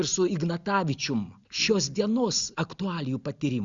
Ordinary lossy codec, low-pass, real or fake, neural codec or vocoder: Opus, 64 kbps; 7.2 kHz; real; none